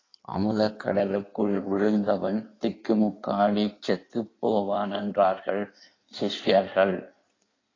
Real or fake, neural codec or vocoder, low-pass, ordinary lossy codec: fake; codec, 16 kHz in and 24 kHz out, 1.1 kbps, FireRedTTS-2 codec; 7.2 kHz; AAC, 32 kbps